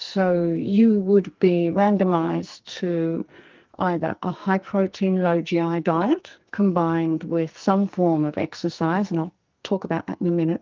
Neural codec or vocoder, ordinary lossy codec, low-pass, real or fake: codec, 44.1 kHz, 2.6 kbps, SNAC; Opus, 32 kbps; 7.2 kHz; fake